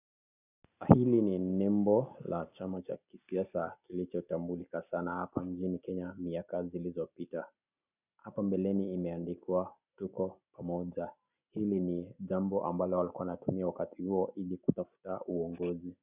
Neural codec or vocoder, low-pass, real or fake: none; 3.6 kHz; real